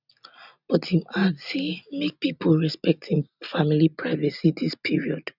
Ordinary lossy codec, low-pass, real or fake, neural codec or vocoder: none; 5.4 kHz; real; none